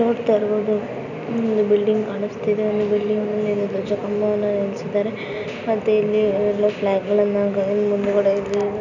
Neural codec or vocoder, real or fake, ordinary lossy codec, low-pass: none; real; none; 7.2 kHz